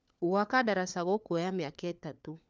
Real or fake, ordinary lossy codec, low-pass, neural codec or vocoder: fake; none; none; codec, 16 kHz, 4 kbps, FunCodec, trained on LibriTTS, 50 frames a second